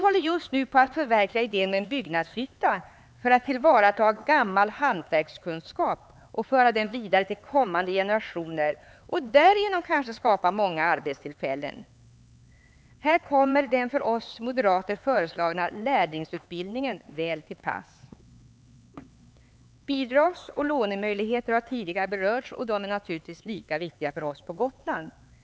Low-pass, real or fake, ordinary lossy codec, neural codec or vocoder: none; fake; none; codec, 16 kHz, 4 kbps, X-Codec, HuBERT features, trained on LibriSpeech